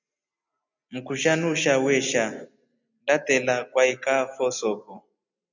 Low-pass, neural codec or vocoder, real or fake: 7.2 kHz; none; real